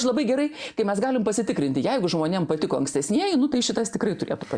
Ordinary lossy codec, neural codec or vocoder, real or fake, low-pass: Opus, 64 kbps; none; real; 9.9 kHz